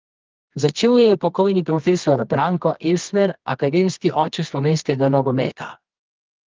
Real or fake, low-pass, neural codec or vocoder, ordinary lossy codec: fake; 7.2 kHz; codec, 24 kHz, 0.9 kbps, WavTokenizer, medium music audio release; Opus, 32 kbps